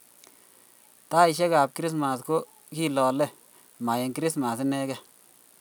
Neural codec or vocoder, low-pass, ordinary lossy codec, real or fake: none; none; none; real